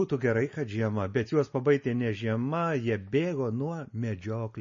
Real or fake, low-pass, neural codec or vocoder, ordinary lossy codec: real; 7.2 kHz; none; MP3, 32 kbps